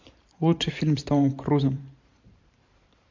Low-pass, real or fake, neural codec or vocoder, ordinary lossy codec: 7.2 kHz; fake; vocoder, 44.1 kHz, 128 mel bands every 512 samples, BigVGAN v2; MP3, 64 kbps